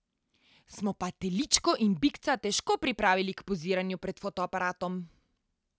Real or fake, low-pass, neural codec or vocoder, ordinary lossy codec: real; none; none; none